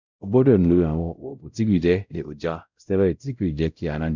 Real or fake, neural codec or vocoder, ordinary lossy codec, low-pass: fake; codec, 16 kHz, 0.5 kbps, X-Codec, WavLM features, trained on Multilingual LibriSpeech; none; 7.2 kHz